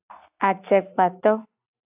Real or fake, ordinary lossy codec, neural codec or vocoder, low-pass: real; AAC, 32 kbps; none; 3.6 kHz